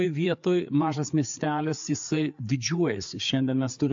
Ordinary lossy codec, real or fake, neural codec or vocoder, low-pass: AAC, 64 kbps; fake; codec, 16 kHz, 4 kbps, FreqCodec, larger model; 7.2 kHz